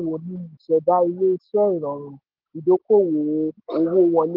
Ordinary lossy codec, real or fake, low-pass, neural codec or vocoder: Opus, 16 kbps; real; 5.4 kHz; none